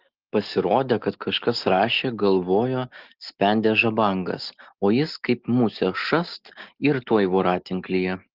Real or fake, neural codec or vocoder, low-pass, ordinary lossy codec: real; none; 5.4 kHz; Opus, 16 kbps